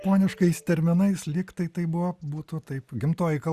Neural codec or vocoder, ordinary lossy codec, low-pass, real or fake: none; Opus, 64 kbps; 14.4 kHz; real